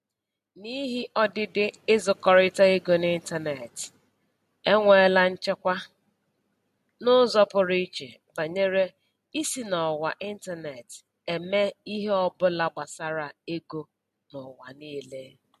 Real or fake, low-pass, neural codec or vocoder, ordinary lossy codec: real; 14.4 kHz; none; MP3, 64 kbps